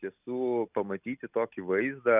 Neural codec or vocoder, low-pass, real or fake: none; 3.6 kHz; real